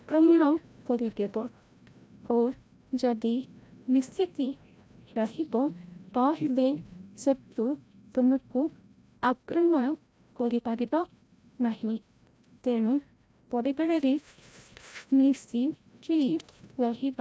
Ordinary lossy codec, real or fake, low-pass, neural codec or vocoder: none; fake; none; codec, 16 kHz, 0.5 kbps, FreqCodec, larger model